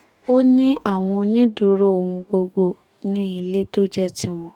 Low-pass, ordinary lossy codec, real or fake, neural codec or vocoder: 19.8 kHz; none; fake; codec, 44.1 kHz, 2.6 kbps, DAC